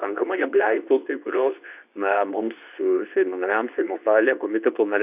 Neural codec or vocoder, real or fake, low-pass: codec, 24 kHz, 0.9 kbps, WavTokenizer, medium speech release version 2; fake; 3.6 kHz